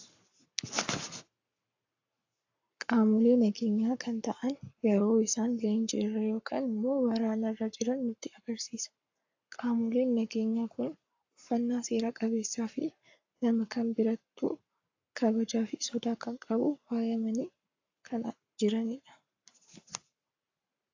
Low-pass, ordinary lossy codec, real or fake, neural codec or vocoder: 7.2 kHz; AAC, 48 kbps; fake; codec, 44.1 kHz, 7.8 kbps, Pupu-Codec